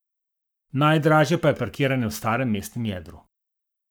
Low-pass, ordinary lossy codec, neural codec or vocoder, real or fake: none; none; codec, 44.1 kHz, 7.8 kbps, Pupu-Codec; fake